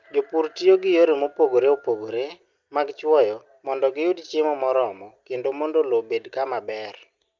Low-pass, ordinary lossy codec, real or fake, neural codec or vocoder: 7.2 kHz; Opus, 24 kbps; real; none